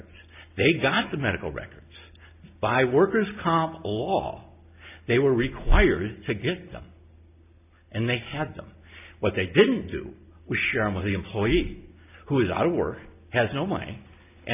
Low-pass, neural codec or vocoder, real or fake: 3.6 kHz; none; real